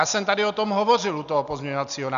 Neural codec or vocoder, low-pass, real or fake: none; 7.2 kHz; real